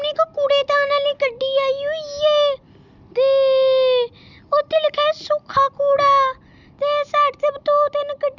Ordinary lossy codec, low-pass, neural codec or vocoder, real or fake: none; 7.2 kHz; none; real